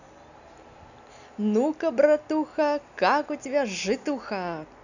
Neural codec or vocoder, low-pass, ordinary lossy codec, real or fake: none; 7.2 kHz; none; real